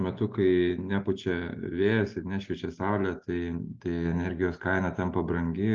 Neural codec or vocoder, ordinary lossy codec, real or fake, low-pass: none; Opus, 24 kbps; real; 7.2 kHz